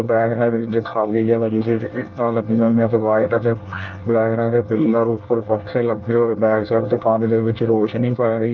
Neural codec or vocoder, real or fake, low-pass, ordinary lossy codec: codec, 24 kHz, 1 kbps, SNAC; fake; 7.2 kHz; Opus, 32 kbps